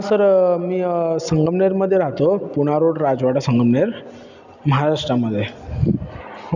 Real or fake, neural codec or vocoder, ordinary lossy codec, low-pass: real; none; none; 7.2 kHz